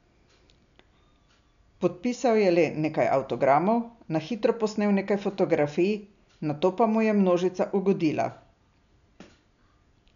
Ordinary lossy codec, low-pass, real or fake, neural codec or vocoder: none; 7.2 kHz; real; none